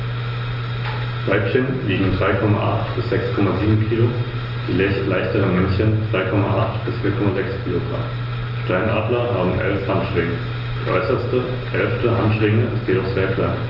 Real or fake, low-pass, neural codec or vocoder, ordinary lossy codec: real; 5.4 kHz; none; Opus, 24 kbps